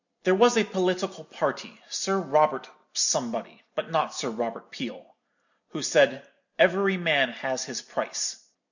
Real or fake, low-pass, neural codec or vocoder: real; 7.2 kHz; none